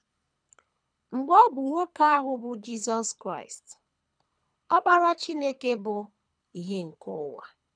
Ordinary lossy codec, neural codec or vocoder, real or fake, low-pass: none; codec, 24 kHz, 3 kbps, HILCodec; fake; 9.9 kHz